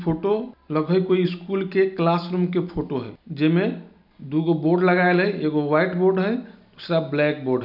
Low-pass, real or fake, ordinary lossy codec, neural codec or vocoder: 5.4 kHz; real; none; none